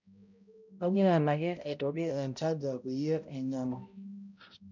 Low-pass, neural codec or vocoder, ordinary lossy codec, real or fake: 7.2 kHz; codec, 16 kHz, 0.5 kbps, X-Codec, HuBERT features, trained on balanced general audio; none; fake